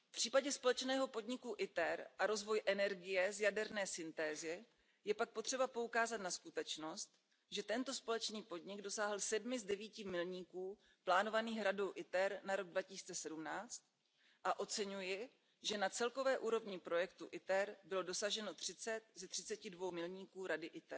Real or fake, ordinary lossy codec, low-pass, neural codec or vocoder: real; none; none; none